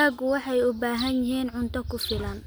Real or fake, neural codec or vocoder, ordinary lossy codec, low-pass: real; none; none; none